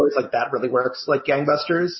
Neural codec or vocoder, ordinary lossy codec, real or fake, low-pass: none; MP3, 24 kbps; real; 7.2 kHz